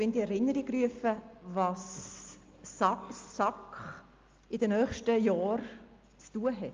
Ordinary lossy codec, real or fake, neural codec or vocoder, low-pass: Opus, 32 kbps; real; none; 7.2 kHz